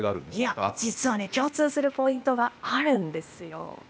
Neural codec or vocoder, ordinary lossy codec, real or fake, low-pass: codec, 16 kHz, 0.8 kbps, ZipCodec; none; fake; none